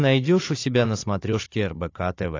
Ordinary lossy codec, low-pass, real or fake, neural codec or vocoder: AAC, 32 kbps; 7.2 kHz; fake; codec, 16 kHz, 16 kbps, FunCodec, trained on Chinese and English, 50 frames a second